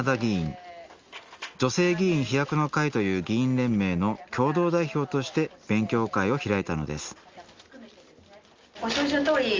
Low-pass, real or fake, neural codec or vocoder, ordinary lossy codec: 7.2 kHz; real; none; Opus, 32 kbps